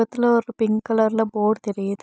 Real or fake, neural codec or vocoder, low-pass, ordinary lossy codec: real; none; none; none